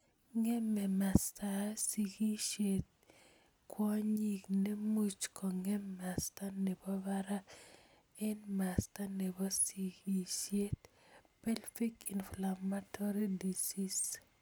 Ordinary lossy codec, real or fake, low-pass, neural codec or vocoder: none; real; none; none